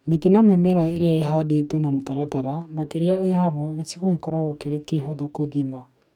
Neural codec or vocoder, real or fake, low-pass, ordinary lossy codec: codec, 44.1 kHz, 2.6 kbps, DAC; fake; 19.8 kHz; none